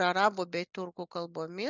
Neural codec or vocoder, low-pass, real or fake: none; 7.2 kHz; real